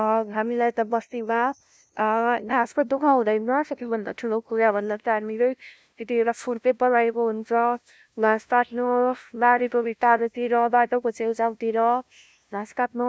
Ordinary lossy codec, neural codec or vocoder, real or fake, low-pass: none; codec, 16 kHz, 0.5 kbps, FunCodec, trained on LibriTTS, 25 frames a second; fake; none